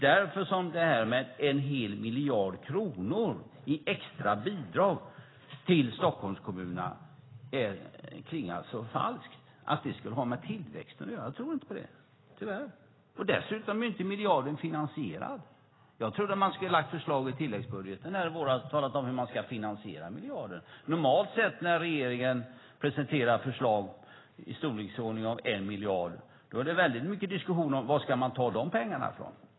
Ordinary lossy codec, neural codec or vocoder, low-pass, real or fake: AAC, 16 kbps; none; 7.2 kHz; real